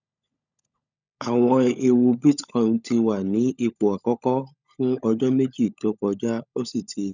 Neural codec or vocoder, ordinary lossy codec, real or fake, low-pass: codec, 16 kHz, 16 kbps, FunCodec, trained on LibriTTS, 50 frames a second; none; fake; 7.2 kHz